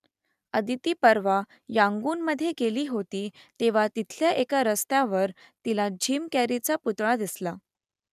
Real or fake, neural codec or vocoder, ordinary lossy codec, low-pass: real; none; none; 14.4 kHz